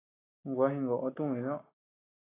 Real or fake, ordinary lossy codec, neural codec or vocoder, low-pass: real; AAC, 16 kbps; none; 3.6 kHz